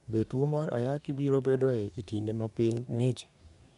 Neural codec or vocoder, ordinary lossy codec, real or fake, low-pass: codec, 24 kHz, 1 kbps, SNAC; none; fake; 10.8 kHz